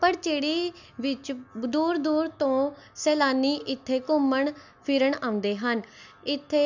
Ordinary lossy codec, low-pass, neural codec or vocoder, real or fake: none; 7.2 kHz; none; real